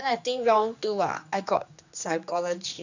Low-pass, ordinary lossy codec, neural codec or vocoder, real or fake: 7.2 kHz; none; codec, 16 kHz, 2 kbps, X-Codec, HuBERT features, trained on general audio; fake